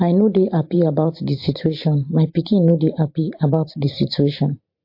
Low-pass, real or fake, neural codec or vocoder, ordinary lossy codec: 5.4 kHz; fake; vocoder, 44.1 kHz, 128 mel bands every 512 samples, BigVGAN v2; MP3, 32 kbps